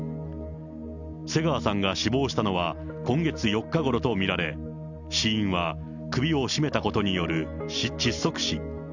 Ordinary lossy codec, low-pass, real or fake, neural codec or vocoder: none; 7.2 kHz; real; none